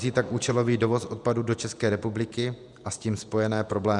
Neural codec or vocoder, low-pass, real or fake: vocoder, 48 kHz, 128 mel bands, Vocos; 10.8 kHz; fake